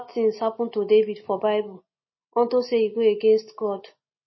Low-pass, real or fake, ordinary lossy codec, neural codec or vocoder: 7.2 kHz; real; MP3, 24 kbps; none